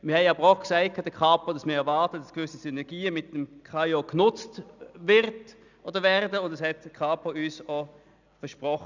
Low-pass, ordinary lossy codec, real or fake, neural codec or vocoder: 7.2 kHz; none; real; none